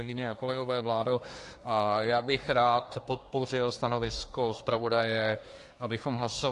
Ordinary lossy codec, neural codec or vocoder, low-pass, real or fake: AAC, 48 kbps; codec, 24 kHz, 1 kbps, SNAC; 10.8 kHz; fake